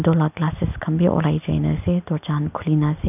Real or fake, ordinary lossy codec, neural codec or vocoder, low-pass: real; none; none; 3.6 kHz